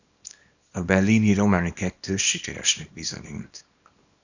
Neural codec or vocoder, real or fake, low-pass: codec, 24 kHz, 0.9 kbps, WavTokenizer, small release; fake; 7.2 kHz